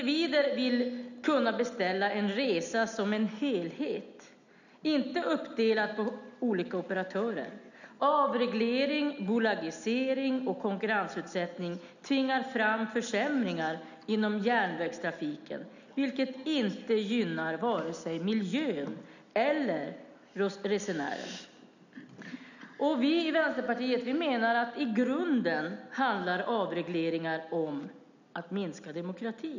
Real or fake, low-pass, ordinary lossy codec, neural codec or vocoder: real; 7.2 kHz; none; none